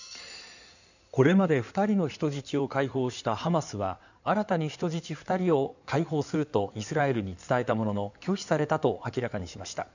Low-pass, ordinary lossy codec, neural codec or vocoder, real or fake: 7.2 kHz; none; codec, 16 kHz in and 24 kHz out, 2.2 kbps, FireRedTTS-2 codec; fake